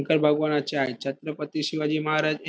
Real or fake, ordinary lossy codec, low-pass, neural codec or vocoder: real; none; none; none